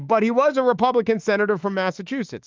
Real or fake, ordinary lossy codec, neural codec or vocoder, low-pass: fake; Opus, 24 kbps; autoencoder, 48 kHz, 32 numbers a frame, DAC-VAE, trained on Japanese speech; 7.2 kHz